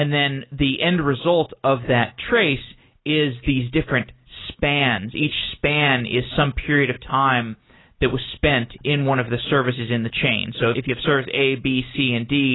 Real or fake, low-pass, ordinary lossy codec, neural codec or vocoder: real; 7.2 kHz; AAC, 16 kbps; none